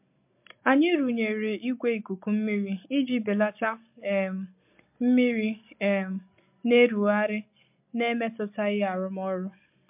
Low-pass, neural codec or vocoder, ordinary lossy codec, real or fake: 3.6 kHz; none; MP3, 32 kbps; real